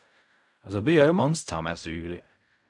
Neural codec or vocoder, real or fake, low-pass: codec, 16 kHz in and 24 kHz out, 0.4 kbps, LongCat-Audio-Codec, fine tuned four codebook decoder; fake; 10.8 kHz